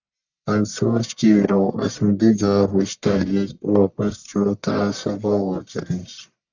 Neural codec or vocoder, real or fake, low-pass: codec, 44.1 kHz, 1.7 kbps, Pupu-Codec; fake; 7.2 kHz